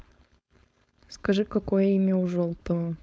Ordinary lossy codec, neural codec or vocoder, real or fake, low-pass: none; codec, 16 kHz, 4.8 kbps, FACodec; fake; none